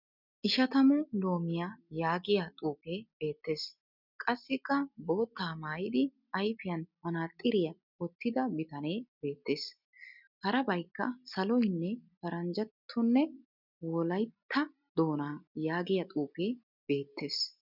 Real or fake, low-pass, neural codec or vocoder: real; 5.4 kHz; none